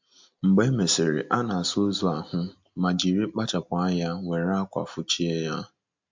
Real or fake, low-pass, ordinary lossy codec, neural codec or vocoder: real; 7.2 kHz; MP3, 64 kbps; none